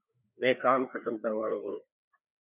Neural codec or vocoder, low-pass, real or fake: codec, 16 kHz, 2 kbps, FreqCodec, larger model; 3.6 kHz; fake